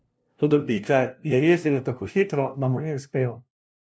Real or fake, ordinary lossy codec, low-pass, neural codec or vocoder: fake; none; none; codec, 16 kHz, 0.5 kbps, FunCodec, trained on LibriTTS, 25 frames a second